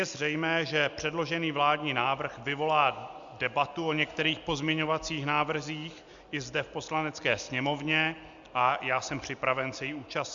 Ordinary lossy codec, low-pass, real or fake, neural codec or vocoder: Opus, 64 kbps; 7.2 kHz; real; none